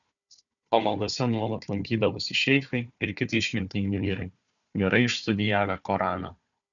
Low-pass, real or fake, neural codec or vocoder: 7.2 kHz; fake; codec, 16 kHz, 4 kbps, FunCodec, trained on Chinese and English, 50 frames a second